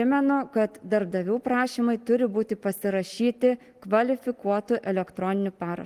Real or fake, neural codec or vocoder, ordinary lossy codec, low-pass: real; none; Opus, 24 kbps; 14.4 kHz